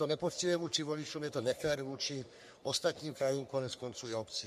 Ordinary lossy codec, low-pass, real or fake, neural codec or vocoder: MP3, 64 kbps; 14.4 kHz; fake; codec, 44.1 kHz, 3.4 kbps, Pupu-Codec